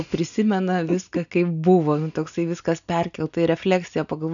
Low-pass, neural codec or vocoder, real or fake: 7.2 kHz; none; real